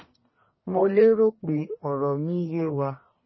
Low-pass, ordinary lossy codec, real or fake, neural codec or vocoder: 7.2 kHz; MP3, 24 kbps; fake; codec, 44.1 kHz, 1.7 kbps, Pupu-Codec